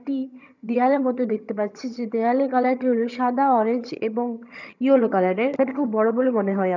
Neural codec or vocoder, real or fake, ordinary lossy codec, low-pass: vocoder, 22.05 kHz, 80 mel bands, HiFi-GAN; fake; none; 7.2 kHz